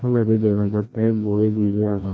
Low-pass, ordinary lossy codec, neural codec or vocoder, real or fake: none; none; codec, 16 kHz, 1 kbps, FreqCodec, larger model; fake